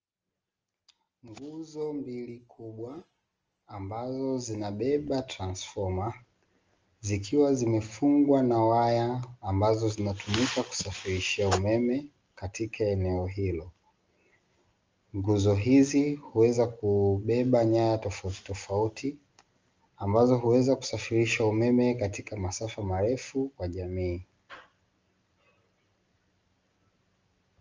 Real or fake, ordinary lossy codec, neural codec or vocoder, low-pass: real; Opus, 24 kbps; none; 7.2 kHz